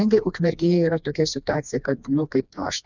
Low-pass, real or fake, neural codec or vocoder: 7.2 kHz; fake; codec, 16 kHz, 2 kbps, FreqCodec, smaller model